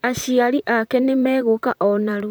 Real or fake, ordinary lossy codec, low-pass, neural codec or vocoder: fake; none; none; vocoder, 44.1 kHz, 128 mel bands, Pupu-Vocoder